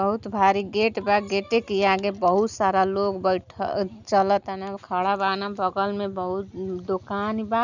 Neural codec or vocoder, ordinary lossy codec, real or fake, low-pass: none; none; real; 7.2 kHz